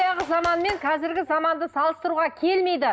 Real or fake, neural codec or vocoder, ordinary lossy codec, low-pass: real; none; none; none